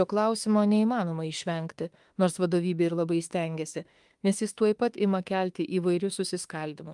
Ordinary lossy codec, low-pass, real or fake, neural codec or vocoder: Opus, 32 kbps; 10.8 kHz; fake; autoencoder, 48 kHz, 32 numbers a frame, DAC-VAE, trained on Japanese speech